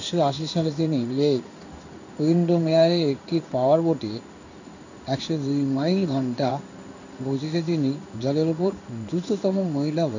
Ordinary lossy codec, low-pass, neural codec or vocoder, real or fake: none; 7.2 kHz; codec, 16 kHz in and 24 kHz out, 1 kbps, XY-Tokenizer; fake